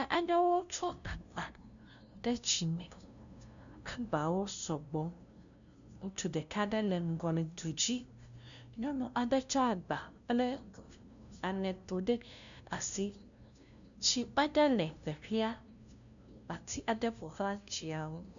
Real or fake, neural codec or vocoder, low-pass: fake; codec, 16 kHz, 0.5 kbps, FunCodec, trained on LibriTTS, 25 frames a second; 7.2 kHz